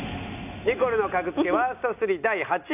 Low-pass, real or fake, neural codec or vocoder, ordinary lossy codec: 3.6 kHz; real; none; none